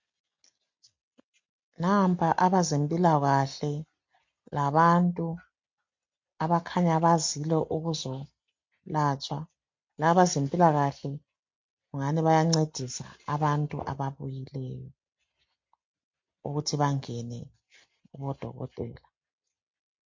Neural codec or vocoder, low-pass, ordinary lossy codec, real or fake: none; 7.2 kHz; MP3, 48 kbps; real